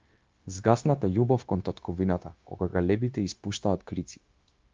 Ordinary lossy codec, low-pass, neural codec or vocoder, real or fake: Opus, 24 kbps; 7.2 kHz; codec, 16 kHz, 0.9 kbps, LongCat-Audio-Codec; fake